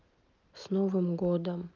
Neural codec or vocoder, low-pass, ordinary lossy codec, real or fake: none; 7.2 kHz; Opus, 24 kbps; real